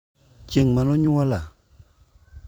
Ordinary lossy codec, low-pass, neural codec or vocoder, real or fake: none; none; vocoder, 44.1 kHz, 128 mel bands every 256 samples, BigVGAN v2; fake